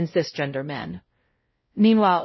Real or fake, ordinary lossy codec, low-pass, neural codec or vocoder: fake; MP3, 24 kbps; 7.2 kHz; codec, 16 kHz, 0.5 kbps, X-Codec, WavLM features, trained on Multilingual LibriSpeech